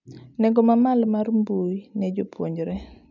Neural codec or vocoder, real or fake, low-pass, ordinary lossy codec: none; real; 7.2 kHz; none